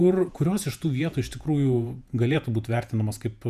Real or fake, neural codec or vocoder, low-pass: fake; vocoder, 48 kHz, 128 mel bands, Vocos; 14.4 kHz